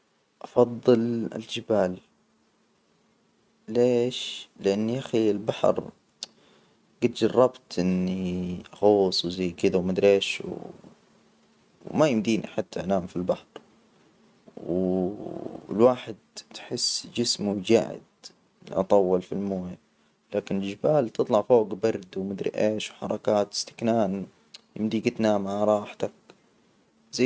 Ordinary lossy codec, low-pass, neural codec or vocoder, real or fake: none; none; none; real